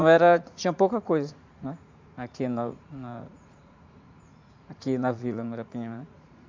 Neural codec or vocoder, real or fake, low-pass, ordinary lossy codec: vocoder, 44.1 kHz, 80 mel bands, Vocos; fake; 7.2 kHz; MP3, 64 kbps